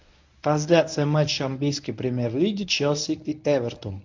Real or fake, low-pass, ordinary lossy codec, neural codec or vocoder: fake; 7.2 kHz; MP3, 64 kbps; codec, 24 kHz, 0.9 kbps, WavTokenizer, medium speech release version 1